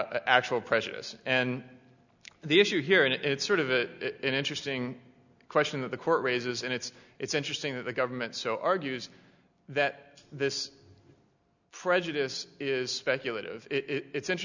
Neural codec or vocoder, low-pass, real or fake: none; 7.2 kHz; real